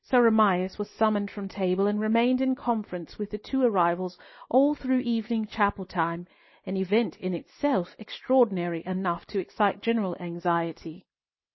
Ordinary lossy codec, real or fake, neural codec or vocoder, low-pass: MP3, 24 kbps; real; none; 7.2 kHz